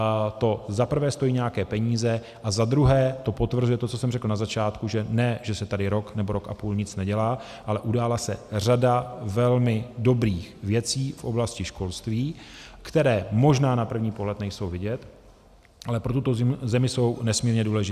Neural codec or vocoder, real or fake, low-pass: none; real; 14.4 kHz